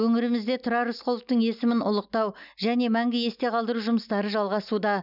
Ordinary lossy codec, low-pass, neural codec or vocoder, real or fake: none; 5.4 kHz; none; real